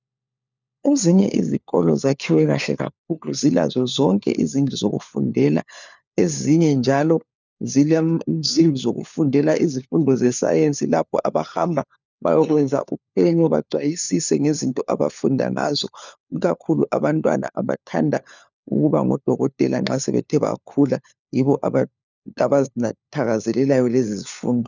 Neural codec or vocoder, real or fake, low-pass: codec, 16 kHz, 4 kbps, FunCodec, trained on LibriTTS, 50 frames a second; fake; 7.2 kHz